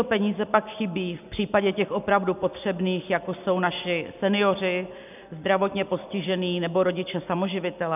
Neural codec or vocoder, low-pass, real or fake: none; 3.6 kHz; real